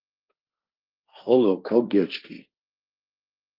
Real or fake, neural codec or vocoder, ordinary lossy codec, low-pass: fake; codec, 16 kHz, 1.1 kbps, Voila-Tokenizer; Opus, 32 kbps; 5.4 kHz